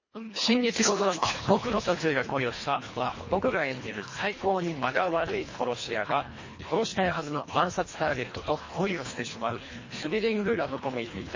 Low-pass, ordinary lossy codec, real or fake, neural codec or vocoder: 7.2 kHz; MP3, 32 kbps; fake; codec, 24 kHz, 1.5 kbps, HILCodec